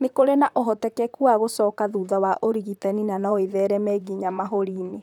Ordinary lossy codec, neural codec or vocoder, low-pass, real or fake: none; vocoder, 44.1 kHz, 128 mel bands, Pupu-Vocoder; 19.8 kHz; fake